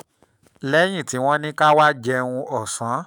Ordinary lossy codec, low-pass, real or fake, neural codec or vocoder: none; none; fake; autoencoder, 48 kHz, 128 numbers a frame, DAC-VAE, trained on Japanese speech